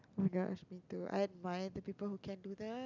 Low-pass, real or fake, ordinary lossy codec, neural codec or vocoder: 7.2 kHz; real; none; none